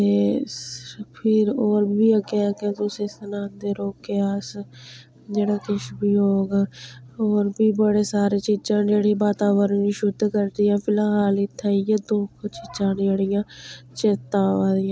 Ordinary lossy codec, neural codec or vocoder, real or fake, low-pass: none; none; real; none